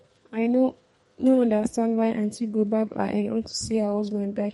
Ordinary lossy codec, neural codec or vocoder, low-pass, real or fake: MP3, 48 kbps; codec, 32 kHz, 1.9 kbps, SNAC; 14.4 kHz; fake